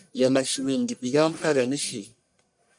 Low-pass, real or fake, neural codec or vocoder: 10.8 kHz; fake; codec, 44.1 kHz, 1.7 kbps, Pupu-Codec